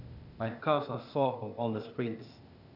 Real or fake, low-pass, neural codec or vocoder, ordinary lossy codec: fake; 5.4 kHz; codec, 16 kHz, 0.8 kbps, ZipCodec; none